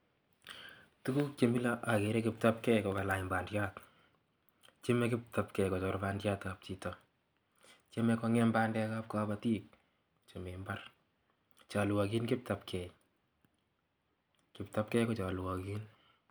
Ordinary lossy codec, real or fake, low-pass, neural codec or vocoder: none; real; none; none